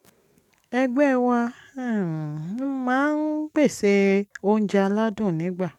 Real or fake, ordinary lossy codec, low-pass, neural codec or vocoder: fake; none; 19.8 kHz; codec, 44.1 kHz, 7.8 kbps, DAC